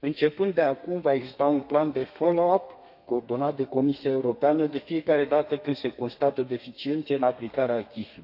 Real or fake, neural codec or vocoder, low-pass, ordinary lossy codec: fake; codec, 32 kHz, 1.9 kbps, SNAC; 5.4 kHz; none